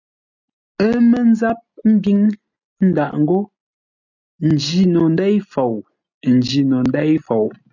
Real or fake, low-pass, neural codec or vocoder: real; 7.2 kHz; none